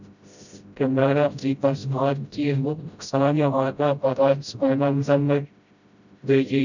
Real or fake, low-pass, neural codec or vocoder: fake; 7.2 kHz; codec, 16 kHz, 0.5 kbps, FreqCodec, smaller model